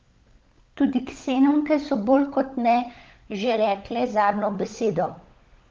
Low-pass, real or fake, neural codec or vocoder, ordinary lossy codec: 7.2 kHz; fake; codec, 16 kHz, 16 kbps, FunCodec, trained on LibriTTS, 50 frames a second; Opus, 24 kbps